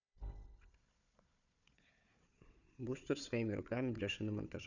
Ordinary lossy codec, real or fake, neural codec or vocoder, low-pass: none; fake; codec, 16 kHz, 4 kbps, FunCodec, trained on Chinese and English, 50 frames a second; 7.2 kHz